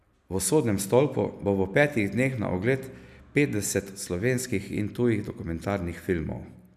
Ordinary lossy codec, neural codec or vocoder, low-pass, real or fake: none; none; 14.4 kHz; real